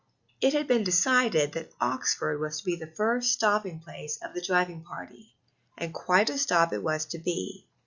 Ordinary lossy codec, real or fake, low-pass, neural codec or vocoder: Opus, 64 kbps; real; 7.2 kHz; none